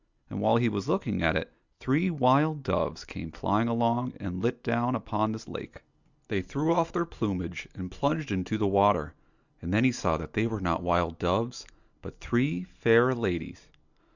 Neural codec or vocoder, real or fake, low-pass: none; real; 7.2 kHz